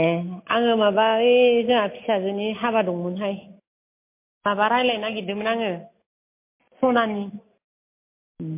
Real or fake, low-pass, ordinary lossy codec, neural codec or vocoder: real; 3.6 kHz; MP3, 24 kbps; none